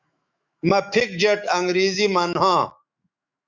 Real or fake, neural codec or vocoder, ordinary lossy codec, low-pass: fake; autoencoder, 48 kHz, 128 numbers a frame, DAC-VAE, trained on Japanese speech; Opus, 64 kbps; 7.2 kHz